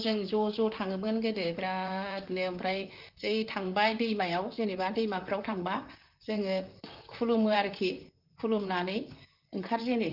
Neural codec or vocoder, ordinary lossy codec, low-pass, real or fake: vocoder, 44.1 kHz, 128 mel bands, Pupu-Vocoder; Opus, 32 kbps; 5.4 kHz; fake